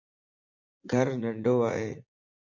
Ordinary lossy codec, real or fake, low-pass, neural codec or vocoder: AAC, 48 kbps; fake; 7.2 kHz; vocoder, 44.1 kHz, 80 mel bands, Vocos